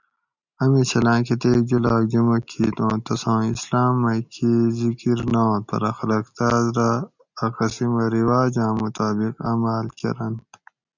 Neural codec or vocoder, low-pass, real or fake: none; 7.2 kHz; real